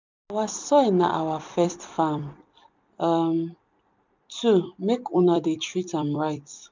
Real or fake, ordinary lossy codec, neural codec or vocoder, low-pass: fake; MP3, 64 kbps; vocoder, 44.1 kHz, 128 mel bands every 256 samples, BigVGAN v2; 7.2 kHz